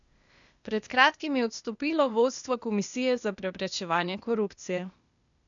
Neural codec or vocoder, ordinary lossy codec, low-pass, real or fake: codec, 16 kHz, 0.8 kbps, ZipCodec; none; 7.2 kHz; fake